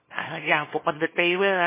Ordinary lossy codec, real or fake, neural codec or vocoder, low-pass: MP3, 16 kbps; fake; codec, 24 kHz, 0.9 kbps, WavTokenizer, small release; 3.6 kHz